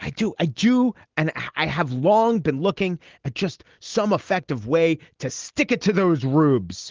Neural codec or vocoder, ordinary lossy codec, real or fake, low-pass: none; Opus, 16 kbps; real; 7.2 kHz